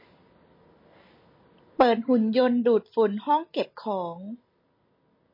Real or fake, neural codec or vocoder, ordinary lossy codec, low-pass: real; none; MP3, 24 kbps; 5.4 kHz